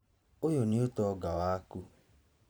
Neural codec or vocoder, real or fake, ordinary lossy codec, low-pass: vocoder, 44.1 kHz, 128 mel bands every 512 samples, BigVGAN v2; fake; none; none